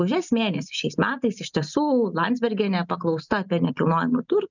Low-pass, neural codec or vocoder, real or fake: 7.2 kHz; none; real